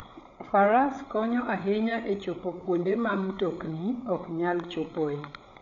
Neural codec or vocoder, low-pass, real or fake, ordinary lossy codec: codec, 16 kHz, 8 kbps, FreqCodec, larger model; 7.2 kHz; fake; none